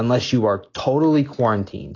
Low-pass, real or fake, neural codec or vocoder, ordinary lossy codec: 7.2 kHz; real; none; AAC, 32 kbps